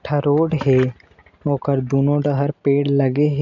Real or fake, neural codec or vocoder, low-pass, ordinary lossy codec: real; none; 7.2 kHz; none